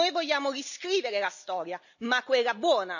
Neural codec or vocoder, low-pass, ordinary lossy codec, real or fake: none; 7.2 kHz; none; real